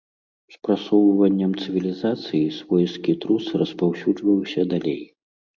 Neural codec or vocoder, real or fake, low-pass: none; real; 7.2 kHz